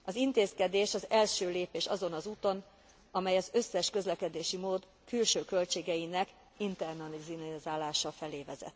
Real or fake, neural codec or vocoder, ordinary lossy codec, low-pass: real; none; none; none